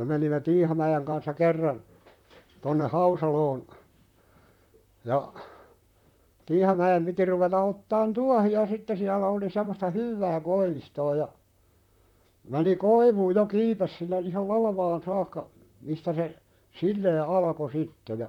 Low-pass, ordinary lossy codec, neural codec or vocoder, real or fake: 19.8 kHz; none; vocoder, 44.1 kHz, 128 mel bands, Pupu-Vocoder; fake